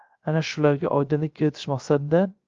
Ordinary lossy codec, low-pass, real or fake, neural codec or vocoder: Opus, 32 kbps; 7.2 kHz; fake; codec, 16 kHz, 0.3 kbps, FocalCodec